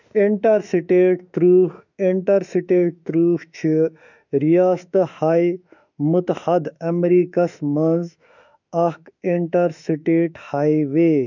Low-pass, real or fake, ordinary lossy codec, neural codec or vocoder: 7.2 kHz; fake; none; autoencoder, 48 kHz, 32 numbers a frame, DAC-VAE, trained on Japanese speech